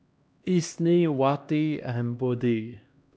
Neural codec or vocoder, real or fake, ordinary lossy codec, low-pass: codec, 16 kHz, 1 kbps, X-Codec, HuBERT features, trained on LibriSpeech; fake; none; none